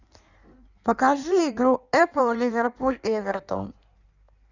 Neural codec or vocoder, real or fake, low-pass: codec, 16 kHz in and 24 kHz out, 1.1 kbps, FireRedTTS-2 codec; fake; 7.2 kHz